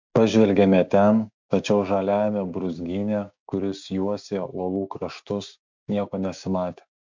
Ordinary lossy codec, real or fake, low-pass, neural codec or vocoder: MP3, 64 kbps; fake; 7.2 kHz; codec, 16 kHz, 6 kbps, DAC